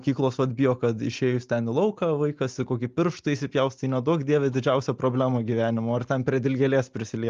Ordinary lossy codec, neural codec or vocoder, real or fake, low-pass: Opus, 32 kbps; none; real; 7.2 kHz